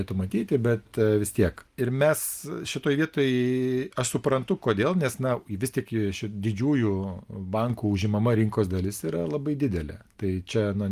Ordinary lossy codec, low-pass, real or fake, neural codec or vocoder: Opus, 32 kbps; 14.4 kHz; real; none